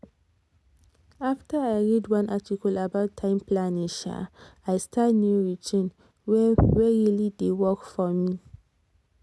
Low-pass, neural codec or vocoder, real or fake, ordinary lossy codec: none; none; real; none